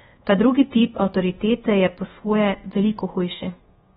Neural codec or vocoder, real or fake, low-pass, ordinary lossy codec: codec, 24 kHz, 1.2 kbps, DualCodec; fake; 10.8 kHz; AAC, 16 kbps